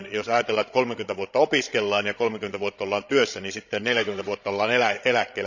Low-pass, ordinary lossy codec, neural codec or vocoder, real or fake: 7.2 kHz; none; codec, 16 kHz, 16 kbps, FreqCodec, larger model; fake